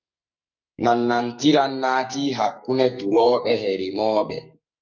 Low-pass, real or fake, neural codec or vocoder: 7.2 kHz; fake; codec, 44.1 kHz, 2.6 kbps, SNAC